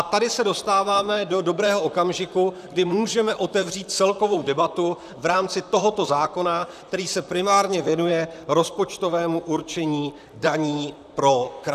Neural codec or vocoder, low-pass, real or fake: vocoder, 44.1 kHz, 128 mel bands, Pupu-Vocoder; 14.4 kHz; fake